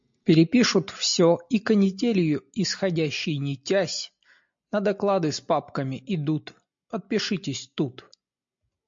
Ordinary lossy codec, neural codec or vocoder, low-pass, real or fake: MP3, 48 kbps; none; 7.2 kHz; real